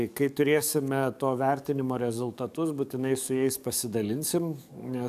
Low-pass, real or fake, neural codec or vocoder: 14.4 kHz; fake; codec, 44.1 kHz, 7.8 kbps, DAC